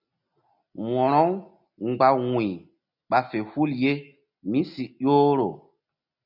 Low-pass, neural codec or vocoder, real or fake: 5.4 kHz; none; real